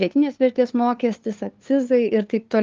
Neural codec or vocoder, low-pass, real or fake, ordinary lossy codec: codec, 16 kHz, 2 kbps, FunCodec, trained on LibriTTS, 25 frames a second; 7.2 kHz; fake; Opus, 24 kbps